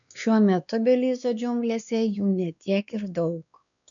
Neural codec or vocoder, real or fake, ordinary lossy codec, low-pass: codec, 16 kHz, 2 kbps, X-Codec, WavLM features, trained on Multilingual LibriSpeech; fake; MP3, 96 kbps; 7.2 kHz